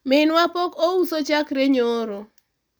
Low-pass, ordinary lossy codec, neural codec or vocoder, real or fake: none; none; none; real